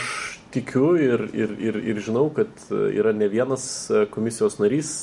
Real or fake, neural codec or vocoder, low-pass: real; none; 10.8 kHz